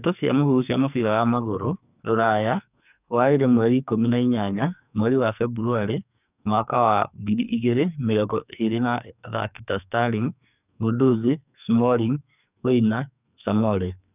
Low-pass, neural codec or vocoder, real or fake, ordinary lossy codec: 3.6 kHz; codec, 44.1 kHz, 2.6 kbps, SNAC; fake; none